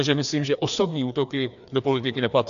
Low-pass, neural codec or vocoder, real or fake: 7.2 kHz; codec, 16 kHz, 2 kbps, FreqCodec, larger model; fake